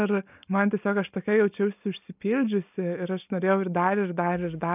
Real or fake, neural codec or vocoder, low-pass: real; none; 3.6 kHz